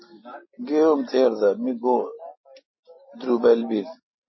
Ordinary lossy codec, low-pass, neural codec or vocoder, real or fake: MP3, 24 kbps; 7.2 kHz; none; real